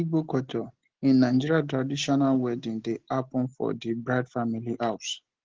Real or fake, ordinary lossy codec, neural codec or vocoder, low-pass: real; Opus, 16 kbps; none; 7.2 kHz